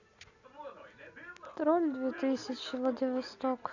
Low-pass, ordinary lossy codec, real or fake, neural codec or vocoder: 7.2 kHz; none; real; none